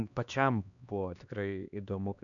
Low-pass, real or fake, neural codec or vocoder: 7.2 kHz; fake; codec, 16 kHz, 2 kbps, X-Codec, HuBERT features, trained on LibriSpeech